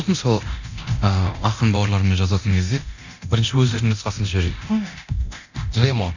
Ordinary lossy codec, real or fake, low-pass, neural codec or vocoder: none; fake; 7.2 kHz; codec, 24 kHz, 0.9 kbps, DualCodec